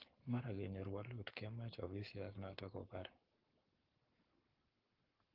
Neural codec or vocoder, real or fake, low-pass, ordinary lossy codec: codec, 44.1 kHz, 7.8 kbps, Pupu-Codec; fake; 5.4 kHz; Opus, 16 kbps